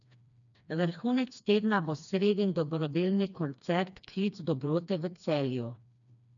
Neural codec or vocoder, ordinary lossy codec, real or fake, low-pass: codec, 16 kHz, 2 kbps, FreqCodec, smaller model; none; fake; 7.2 kHz